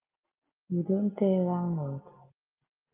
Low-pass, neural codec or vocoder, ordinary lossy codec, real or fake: 3.6 kHz; none; Opus, 24 kbps; real